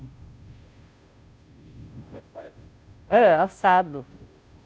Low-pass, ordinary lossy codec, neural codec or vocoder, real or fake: none; none; codec, 16 kHz, 0.5 kbps, FunCodec, trained on Chinese and English, 25 frames a second; fake